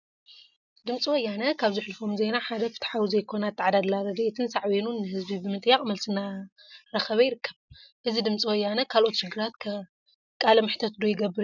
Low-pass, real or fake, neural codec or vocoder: 7.2 kHz; real; none